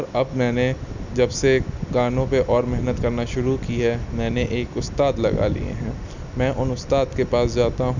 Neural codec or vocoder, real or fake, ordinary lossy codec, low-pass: none; real; none; 7.2 kHz